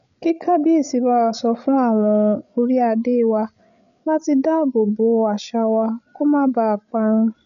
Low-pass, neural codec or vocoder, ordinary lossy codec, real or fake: 7.2 kHz; codec, 16 kHz, 8 kbps, FreqCodec, larger model; none; fake